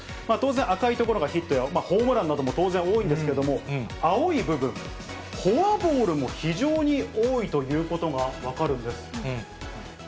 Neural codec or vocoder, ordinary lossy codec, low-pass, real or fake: none; none; none; real